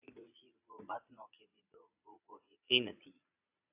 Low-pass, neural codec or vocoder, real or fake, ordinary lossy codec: 3.6 kHz; vocoder, 22.05 kHz, 80 mel bands, Vocos; fake; AAC, 32 kbps